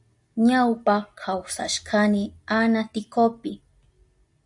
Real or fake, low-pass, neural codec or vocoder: real; 10.8 kHz; none